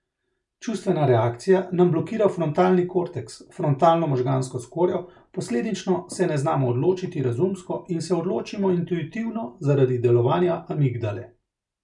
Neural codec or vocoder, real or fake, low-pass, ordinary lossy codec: none; real; 10.8 kHz; none